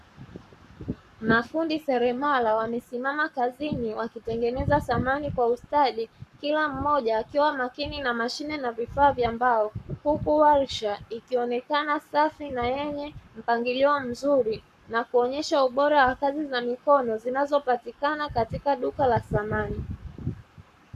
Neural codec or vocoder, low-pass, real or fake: codec, 44.1 kHz, 7.8 kbps, Pupu-Codec; 14.4 kHz; fake